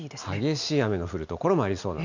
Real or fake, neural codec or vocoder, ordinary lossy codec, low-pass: real; none; none; 7.2 kHz